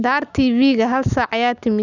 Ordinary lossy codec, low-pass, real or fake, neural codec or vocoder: none; 7.2 kHz; real; none